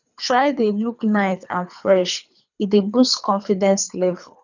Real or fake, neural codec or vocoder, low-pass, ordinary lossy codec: fake; codec, 24 kHz, 3 kbps, HILCodec; 7.2 kHz; none